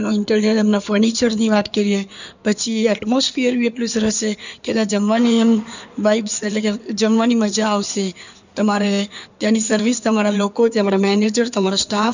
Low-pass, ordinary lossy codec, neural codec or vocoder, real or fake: 7.2 kHz; none; codec, 16 kHz in and 24 kHz out, 2.2 kbps, FireRedTTS-2 codec; fake